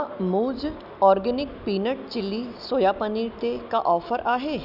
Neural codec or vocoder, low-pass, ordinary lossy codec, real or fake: none; 5.4 kHz; none; real